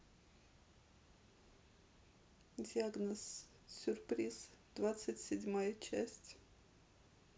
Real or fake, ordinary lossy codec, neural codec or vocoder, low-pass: real; none; none; none